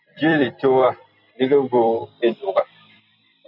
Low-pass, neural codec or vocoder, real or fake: 5.4 kHz; vocoder, 44.1 kHz, 128 mel bands every 256 samples, BigVGAN v2; fake